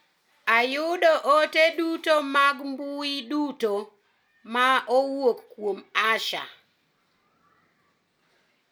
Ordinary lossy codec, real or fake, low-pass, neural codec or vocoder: none; real; none; none